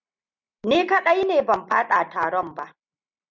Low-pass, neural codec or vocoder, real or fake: 7.2 kHz; none; real